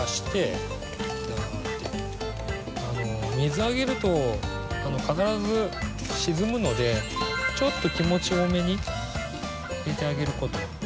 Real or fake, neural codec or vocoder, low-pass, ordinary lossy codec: real; none; none; none